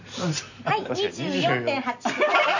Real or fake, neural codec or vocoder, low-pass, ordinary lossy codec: real; none; 7.2 kHz; none